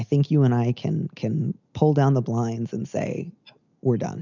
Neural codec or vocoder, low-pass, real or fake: none; 7.2 kHz; real